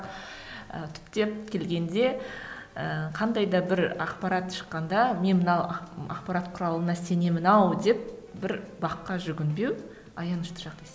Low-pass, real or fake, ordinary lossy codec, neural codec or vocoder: none; real; none; none